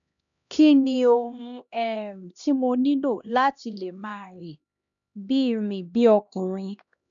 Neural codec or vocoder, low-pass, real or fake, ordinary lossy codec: codec, 16 kHz, 1 kbps, X-Codec, HuBERT features, trained on LibriSpeech; 7.2 kHz; fake; none